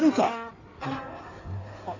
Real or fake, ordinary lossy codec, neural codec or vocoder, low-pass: fake; Opus, 64 kbps; codec, 16 kHz in and 24 kHz out, 2.2 kbps, FireRedTTS-2 codec; 7.2 kHz